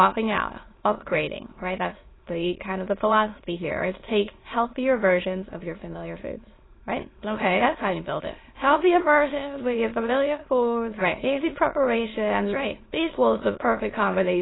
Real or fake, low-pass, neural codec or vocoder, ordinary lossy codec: fake; 7.2 kHz; autoencoder, 22.05 kHz, a latent of 192 numbers a frame, VITS, trained on many speakers; AAC, 16 kbps